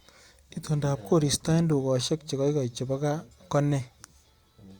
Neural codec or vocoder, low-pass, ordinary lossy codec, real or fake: none; 19.8 kHz; Opus, 64 kbps; real